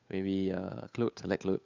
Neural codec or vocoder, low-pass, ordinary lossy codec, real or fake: codec, 16 kHz, 8 kbps, FunCodec, trained on Chinese and English, 25 frames a second; 7.2 kHz; none; fake